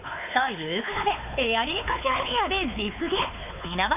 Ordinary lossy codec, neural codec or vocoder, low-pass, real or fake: none; codec, 16 kHz, 4 kbps, X-Codec, WavLM features, trained on Multilingual LibriSpeech; 3.6 kHz; fake